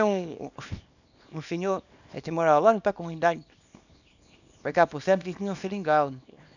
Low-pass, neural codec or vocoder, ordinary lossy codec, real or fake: 7.2 kHz; codec, 24 kHz, 0.9 kbps, WavTokenizer, small release; none; fake